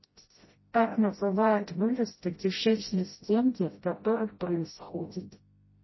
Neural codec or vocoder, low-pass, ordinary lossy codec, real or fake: codec, 16 kHz, 0.5 kbps, FreqCodec, smaller model; 7.2 kHz; MP3, 24 kbps; fake